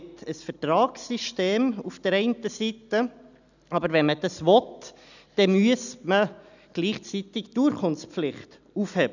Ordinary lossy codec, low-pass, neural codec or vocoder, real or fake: none; 7.2 kHz; none; real